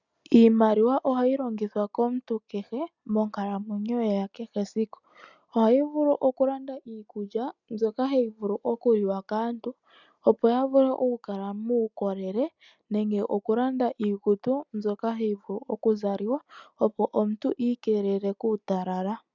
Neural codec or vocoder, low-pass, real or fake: none; 7.2 kHz; real